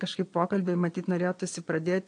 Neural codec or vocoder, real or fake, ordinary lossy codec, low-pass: vocoder, 22.05 kHz, 80 mel bands, Vocos; fake; AAC, 48 kbps; 9.9 kHz